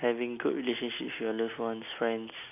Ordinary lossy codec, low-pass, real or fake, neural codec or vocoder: none; 3.6 kHz; real; none